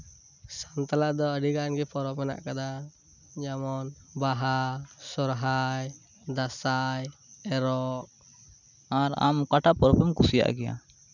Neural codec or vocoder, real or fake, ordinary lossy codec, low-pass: none; real; none; 7.2 kHz